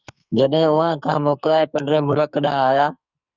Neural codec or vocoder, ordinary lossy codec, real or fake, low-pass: codec, 44.1 kHz, 2.6 kbps, SNAC; Opus, 64 kbps; fake; 7.2 kHz